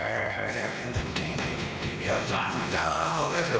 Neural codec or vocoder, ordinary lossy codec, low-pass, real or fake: codec, 16 kHz, 1 kbps, X-Codec, WavLM features, trained on Multilingual LibriSpeech; none; none; fake